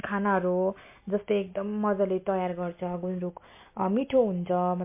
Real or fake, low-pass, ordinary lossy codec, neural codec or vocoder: real; 3.6 kHz; MP3, 24 kbps; none